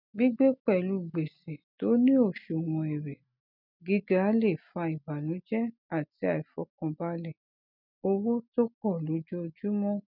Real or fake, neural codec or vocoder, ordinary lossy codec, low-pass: real; none; none; 5.4 kHz